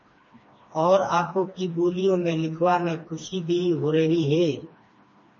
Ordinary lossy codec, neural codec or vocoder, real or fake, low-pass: MP3, 32 kbps; codec, 16 kHz, 2 kbps, FreqCodec, smaller model; fake; 7.2 kHz